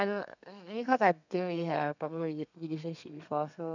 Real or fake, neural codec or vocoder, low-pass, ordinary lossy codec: fake; codec, 32 kHz, 1.9 kbps, SNAC; 7.2 kHz; none